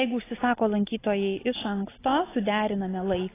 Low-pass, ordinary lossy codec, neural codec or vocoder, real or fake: 3.6 kHz; AAC, 16 kbps; none; real